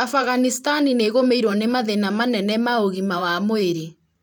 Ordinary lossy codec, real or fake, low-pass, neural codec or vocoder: none; fake; none; vocoder, 44.1 kHz, 128 mel bands every 512 samples, BigVGAN v2